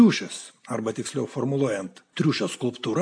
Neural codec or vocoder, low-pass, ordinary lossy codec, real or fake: none; 9.9 kHz; AAC, 64 kbps; real